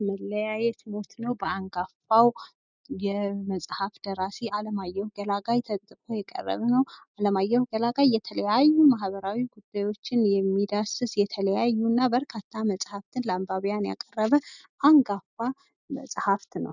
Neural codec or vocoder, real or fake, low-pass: none; real; 7.2 kHz